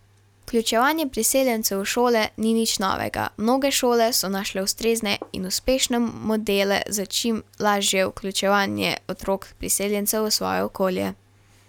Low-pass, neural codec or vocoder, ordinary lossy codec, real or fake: 19.8 kHz; none; none; real